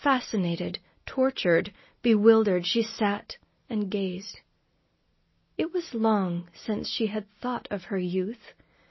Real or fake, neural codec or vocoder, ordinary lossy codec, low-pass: real; none; MP3, 24 kbps; 7.2 kHz